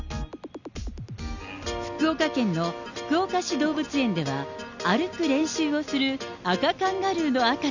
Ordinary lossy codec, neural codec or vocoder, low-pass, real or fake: none; none; 7.2 kHz; real